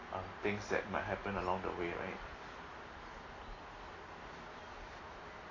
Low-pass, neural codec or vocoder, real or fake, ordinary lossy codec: 7.2 kHz; none; real; AAC, 32 kbps